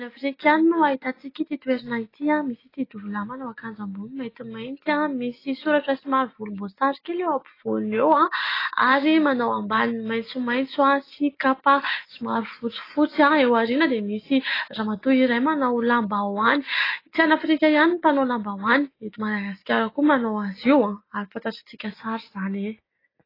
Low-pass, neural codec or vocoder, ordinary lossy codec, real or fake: 5.4 kHz; none; AAC, 24 kbps; real